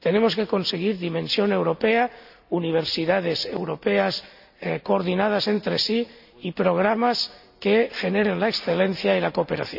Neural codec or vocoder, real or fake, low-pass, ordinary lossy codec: none; real; 5.4 kHz; none